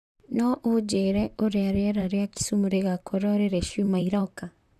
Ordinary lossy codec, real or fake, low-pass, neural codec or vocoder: none; fake; 14.4 kHz; vocoder, 44.1 kHz, 128 mel bands, Pupu-Vocoder